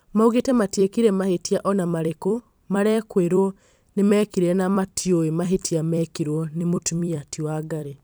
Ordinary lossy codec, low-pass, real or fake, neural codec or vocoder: none; none; fake; vocoder, 44.1 kHz, 128 mel bands every 256 samples, BigVGAN v2